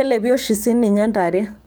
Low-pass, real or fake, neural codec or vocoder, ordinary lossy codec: none; fake; codec, 44.1 kHz, 7.8 kbps, DAC; none